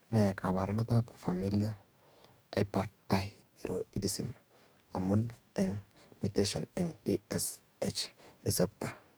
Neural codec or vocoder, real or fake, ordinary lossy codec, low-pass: codec, 44.1 kHz, 2.6 kbps, DAC; fake; none; none